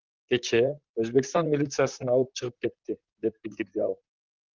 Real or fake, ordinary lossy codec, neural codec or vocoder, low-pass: fake; Opus, 24 kbps; vocoder, 44.1 kHz, 128 mel bands, Pupu-Vocoder; 7.2 kHz